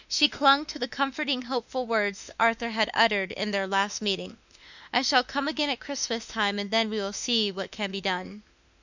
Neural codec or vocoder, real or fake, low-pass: autoencoder, 48 kHz, 32 numbers a frame, DAC-VAE, trained on Japanese speech; fake; 7.2 kHz